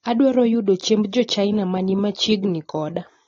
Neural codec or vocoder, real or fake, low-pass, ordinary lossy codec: none; real; 7.2 kHz; AAC, 32 kbps